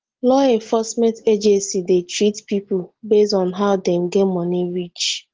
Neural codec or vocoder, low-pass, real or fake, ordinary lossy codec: none; 7.2 kHz; real; Opus, 16 kbps